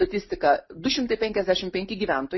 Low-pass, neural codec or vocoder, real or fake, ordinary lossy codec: 7.2 kHz; none; real; MP3, 24 kbps